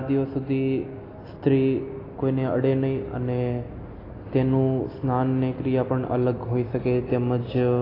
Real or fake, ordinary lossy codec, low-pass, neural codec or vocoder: real; AAC, 24 kbps; 5.4 kHz; none